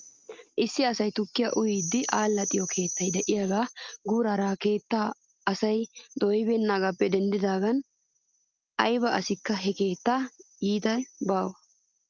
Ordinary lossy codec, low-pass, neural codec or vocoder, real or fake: Opus, 32 kbps; 7.2 kHz; none; real